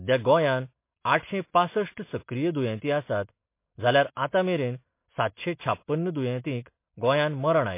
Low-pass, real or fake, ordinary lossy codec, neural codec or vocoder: 3.6 kHz; real; MP3, 24 kbps; none